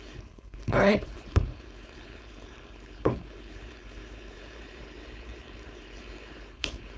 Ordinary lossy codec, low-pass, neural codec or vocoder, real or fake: none; none; codec, 16 kHz, 4.8 kbps, FACodec; fake